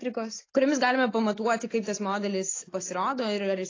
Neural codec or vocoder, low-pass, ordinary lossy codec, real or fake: none; 7.2 kHz; AAC, 32 kbps; real